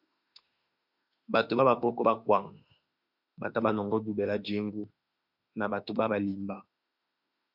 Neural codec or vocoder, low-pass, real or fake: autoencoder, 48 kHz, 32 numbers a frame, DAC-VAE, trained on Japanese speech; 5.4 kHz; fake